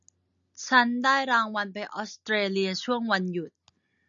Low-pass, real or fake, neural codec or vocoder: 7.2 kHz; real; none